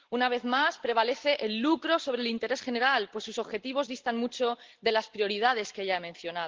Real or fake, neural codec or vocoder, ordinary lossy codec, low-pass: real; none; Opus, 16 kbps; 7.2 kHz